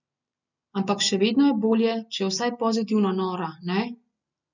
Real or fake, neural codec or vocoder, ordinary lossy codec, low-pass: real; none; none; 7.2 kHz